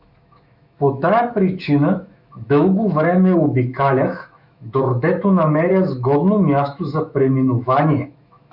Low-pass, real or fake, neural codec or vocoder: 5.4 kHz; fake; autoencoder, 48 kHz, 128 numbers a frame, DAC-VAE, trained on Japanese speech